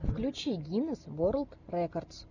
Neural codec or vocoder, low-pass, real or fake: none; 7.2 kHz; real